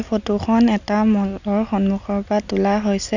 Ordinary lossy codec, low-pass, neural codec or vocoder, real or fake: none; 7.2 kHz; none; real